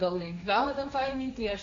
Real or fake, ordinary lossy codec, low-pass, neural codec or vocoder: fake; AAC, 48 kbps; 7.2 kHz; codec, 16 kHz, 1.1 kbps, Voila-Tokenizer